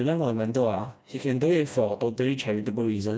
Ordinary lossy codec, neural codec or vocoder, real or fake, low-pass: none; codec, 16 kHz, 1 kbps, FreqCodec, smaller model; fake; none